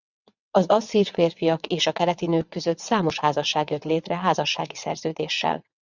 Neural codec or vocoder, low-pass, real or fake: vocoder, 22.05 kHz, 80 mel bands, WaveNeXt; 7.2 kHz; fake